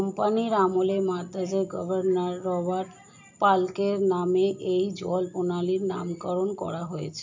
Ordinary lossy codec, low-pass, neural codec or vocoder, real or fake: MP3, 64 kbps; 7.2 kHz; none; real